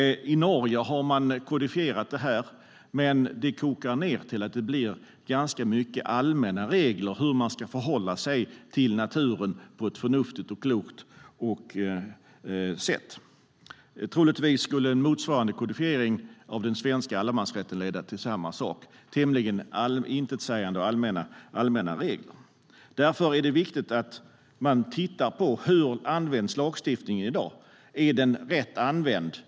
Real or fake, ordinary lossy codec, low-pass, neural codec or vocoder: real; none; none; none